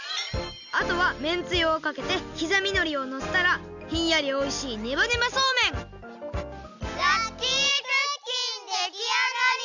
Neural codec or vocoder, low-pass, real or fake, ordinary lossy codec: none; 7.2 kHz; real; none